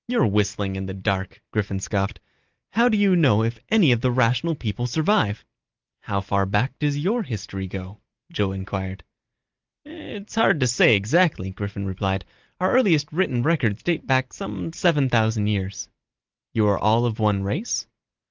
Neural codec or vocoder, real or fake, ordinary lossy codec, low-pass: none; real; Opus, 32 kbps; 7.2 kHz